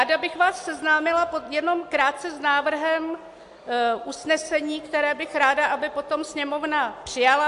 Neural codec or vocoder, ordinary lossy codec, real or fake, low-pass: none; AAC, 64 kbps; real; 10.8 kHz